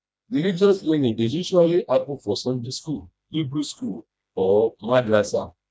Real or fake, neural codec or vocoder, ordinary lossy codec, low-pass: fake; codec, 16 kHz, 1 kbps, FreqCodec, smaller model; none; none